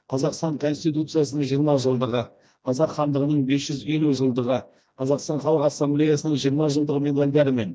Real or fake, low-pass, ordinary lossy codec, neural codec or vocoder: fake; none; none; codec, 16 kHz, 1 kbps, FreqCodec, smaller model